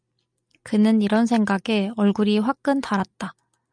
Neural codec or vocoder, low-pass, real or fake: none; 9.9 kHz; real